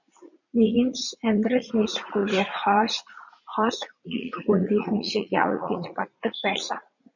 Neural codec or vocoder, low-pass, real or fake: vocoder, 44.1 kHz, 80 mel bands, Vocos; 7.2 kHz; fake